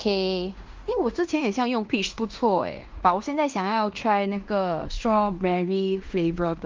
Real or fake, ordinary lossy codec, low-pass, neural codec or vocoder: fake; Opus, 32 kbps; 7.2 kHz; codec, 16 kHz in and 24 kHz out, 0.9 kbps, LongCat-Audio-Codec, fine tuned four codebook decoder